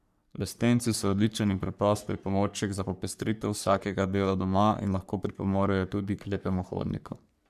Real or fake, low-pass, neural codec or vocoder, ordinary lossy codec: fake; 14.4 kHz; codec, 44.1 kHz, 3.4 kbps, Pupu-Codec; none